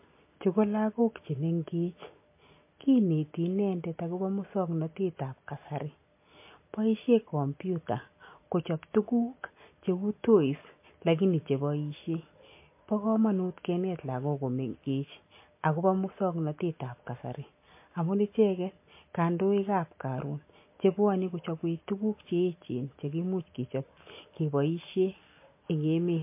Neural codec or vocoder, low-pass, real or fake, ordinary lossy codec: none; 3.6 kHz; real; MP3, 24 kbps